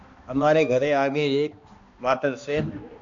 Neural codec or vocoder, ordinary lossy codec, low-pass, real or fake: codec, 16 kHz, 1 kbps, X-Codec, HuBERT features, trained on balanced general audio; MP3, 64 kbps; 7.2 kHz; fake